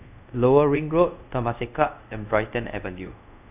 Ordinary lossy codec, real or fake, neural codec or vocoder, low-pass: none; fake; codec, 24 kHz, 0.5 kbps, DualCodec; 3.6 kHz